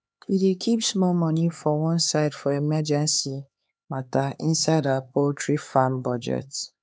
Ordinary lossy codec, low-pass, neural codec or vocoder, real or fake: none; none; codec, 16 kHz, 4 kbps, X-Codec, HuBERT features, trained on LibriSpeech; fake